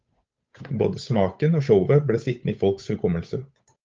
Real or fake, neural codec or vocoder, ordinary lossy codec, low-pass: fake; codec, 16 kHz, 8 kbps, FunCodec, trained on Chinese and English, 25 frames a second; Opus, 24 kbps; 7.2 kHz